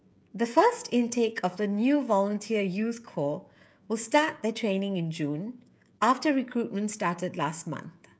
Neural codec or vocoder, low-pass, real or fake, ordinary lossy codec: codec, 16 kHz, 16 kbps, FreqCodec, smaller model; none; fake; none